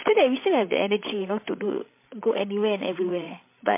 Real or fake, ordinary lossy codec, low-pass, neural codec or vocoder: fake; MP3, 24 kbps; 3.6 kHz; codec, 16 kHz, 16 kbps, FreqCodec, larger model